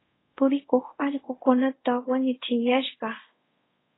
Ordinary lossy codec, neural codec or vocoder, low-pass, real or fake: AAC, 16 kbps; codec, 24 kHz, 0.5 kbps, DualCodec; 7.2 kHz; fake